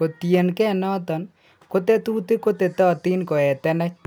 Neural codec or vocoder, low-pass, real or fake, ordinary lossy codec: none; none; real; none